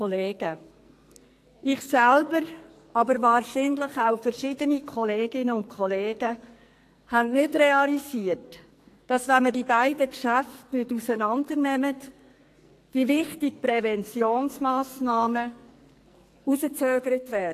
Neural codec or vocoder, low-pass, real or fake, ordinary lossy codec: codec, 44.1 kHz, 2.6 kbps, SNAC; 14.4 kHz; fake; AAC, 64 kbps